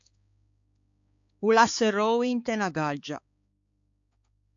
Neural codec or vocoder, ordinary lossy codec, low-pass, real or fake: codec, 16 kHz, 4 kbps, X-Codec, HuBERT features, trained on balanced general audio; AAC, 64 kbps; 7.2 kHz; fake